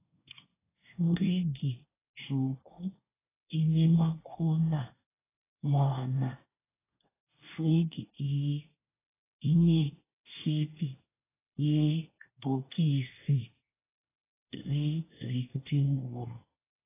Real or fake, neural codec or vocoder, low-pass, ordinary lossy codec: fake; codec, 24 kHz, 1 kbps, SNAC; 3.6 kHz; AAC, 16 kbps